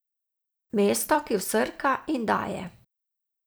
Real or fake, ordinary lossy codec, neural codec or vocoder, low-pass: fake; none; vocoder, 44.1 kHz, 128 mel bands every 256 samples, BigVGAN v2; none